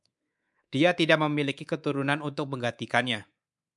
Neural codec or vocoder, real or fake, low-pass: codec, 24 kHz, 3.1 kbps, DualCodec; fake; 10.8 kHz